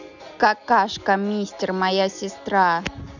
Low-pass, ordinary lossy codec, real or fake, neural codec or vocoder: 7.2 kHz; none; real; none